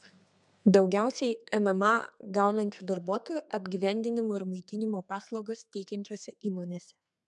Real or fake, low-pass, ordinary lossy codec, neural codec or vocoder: fake; 10.8 kHz; MP3, 96 kbps; codec, 32 kHz, 1.9 kbps, SNAC